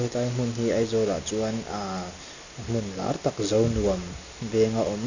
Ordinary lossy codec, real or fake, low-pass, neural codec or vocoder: none; real; 7.2 kHz; none